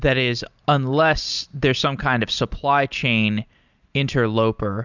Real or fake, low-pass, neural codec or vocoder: real; 7.2 kHz; none